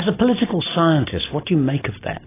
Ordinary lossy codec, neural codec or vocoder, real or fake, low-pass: AAC, 16 kbps; none; real; 3.6 kHz